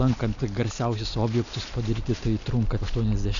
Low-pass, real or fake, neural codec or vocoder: 7.2 kHz; real; none